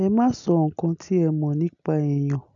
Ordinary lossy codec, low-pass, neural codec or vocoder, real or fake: none; 7.2 kHz; none; real